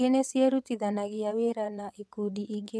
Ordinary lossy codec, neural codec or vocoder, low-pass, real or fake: none; vocoder, 22.05 kHz, 80 mel bands, WaveNeXt; none; fake